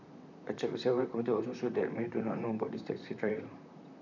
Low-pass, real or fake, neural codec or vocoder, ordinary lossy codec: 7.2 kHz; fake; vocoder, 44.1 kHz, 128 mel bands, Pupu-Vocoder; none